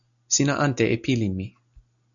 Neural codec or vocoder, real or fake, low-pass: none; real; 7.2 kHz